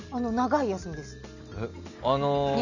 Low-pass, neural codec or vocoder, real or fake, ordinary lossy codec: 7.2 kHz; none; real; none